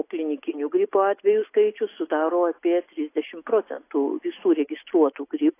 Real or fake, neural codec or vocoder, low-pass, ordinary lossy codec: real; none; 3.6 kHz; AAC, 24 kbps